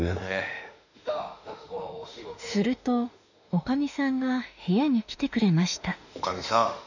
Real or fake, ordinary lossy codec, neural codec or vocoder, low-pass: fake; none; autoencoder, 48 kHz, 32 numbers a frame, DAC-VAE, trained on Japanese speech; 7.2 kHz